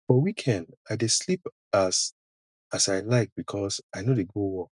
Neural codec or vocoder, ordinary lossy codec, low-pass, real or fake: none; none; 10.8 kHz; real